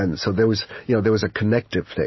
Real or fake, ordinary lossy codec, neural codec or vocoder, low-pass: real; MP3, 24 kbps; none; 7.2 kHz